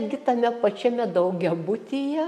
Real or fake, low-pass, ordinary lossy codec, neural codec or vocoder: real; 14.4 kHz; MP3, 64 kbps; none